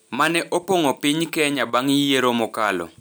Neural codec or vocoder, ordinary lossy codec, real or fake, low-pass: none; none; real; none